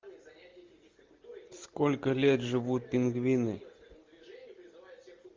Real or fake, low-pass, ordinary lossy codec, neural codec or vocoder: real; 7.2 kHz; Opus, 32 kbps; none